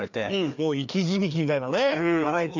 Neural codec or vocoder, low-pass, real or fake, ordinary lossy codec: codec, 16 kHz, 2 kbps, FreqCodec, larger model; 7.2 kHz; fake; none